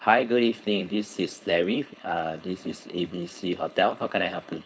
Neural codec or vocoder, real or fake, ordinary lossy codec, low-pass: codec, 16 kHz, 4.8 kbps, FACodec; fake; none; none